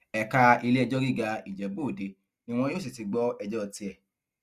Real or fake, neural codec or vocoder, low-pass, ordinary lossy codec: real; none; 14.4 kHz; Opus, 64 kbps